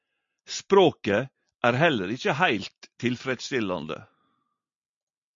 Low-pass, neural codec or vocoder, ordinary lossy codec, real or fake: 7.2 kHz; none; MP3, 48 kbps; real